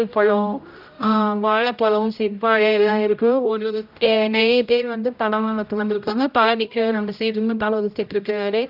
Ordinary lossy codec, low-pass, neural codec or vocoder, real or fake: none; 5.4 kHz; codec, 16 kHz, 0.5 kbps, X-Codec, HuBERT features, trained on general audio; fake